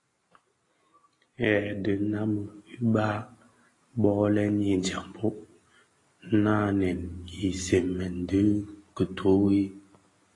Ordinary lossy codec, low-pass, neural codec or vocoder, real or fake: AAC, 32 kbps; 10.8 kHz; none; real